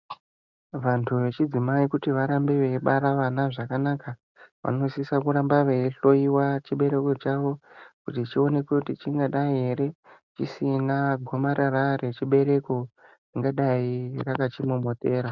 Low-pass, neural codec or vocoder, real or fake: 7.2 kHz; none; real